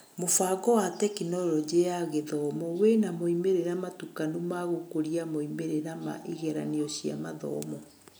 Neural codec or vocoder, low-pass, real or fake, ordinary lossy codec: none; none; real; none